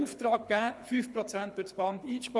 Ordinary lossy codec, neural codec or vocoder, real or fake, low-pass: none; codec, 24 kHz, 6 kbps, HILCodec; fake; none